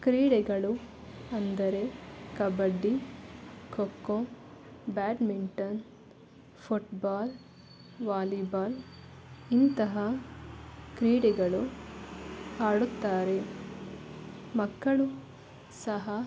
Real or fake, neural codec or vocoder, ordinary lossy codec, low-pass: real; none; none; none